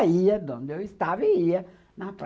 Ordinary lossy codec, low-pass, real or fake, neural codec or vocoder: none; none; real; none